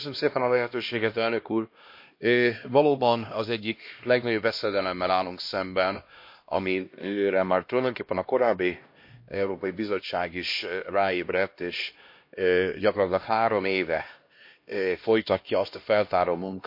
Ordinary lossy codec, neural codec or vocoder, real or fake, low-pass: MP3, 32 kbps; codec, 16 kHz, 1 kbps, X-Codec, HuBERT features, trained on LibriSpeech; fake; 5.4 kHz